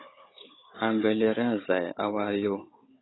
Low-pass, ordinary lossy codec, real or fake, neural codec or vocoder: 7.2 kHz; AAC, 16 kbps; fake; codec, 16 kHz, 16 kbps, FunCodec, trained on Chinese and English, 50 frames a second